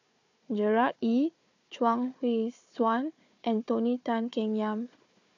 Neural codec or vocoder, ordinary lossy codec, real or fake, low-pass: codec, 16 kHz, 4 kbps, FunCodec, trained on Chinese and English, 50 frames a second; AAC, 48 kbps; fake; 7.2 kHz